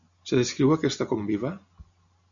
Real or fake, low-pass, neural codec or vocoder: real; 7.2 kHz; none